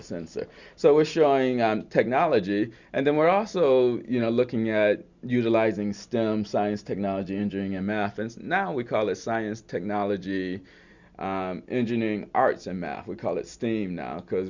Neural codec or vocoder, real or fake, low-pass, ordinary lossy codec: none; real; 7.2 kHz; Opus, 64 kbps